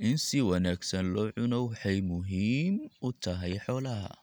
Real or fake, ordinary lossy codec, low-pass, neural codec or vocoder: real; none; none; none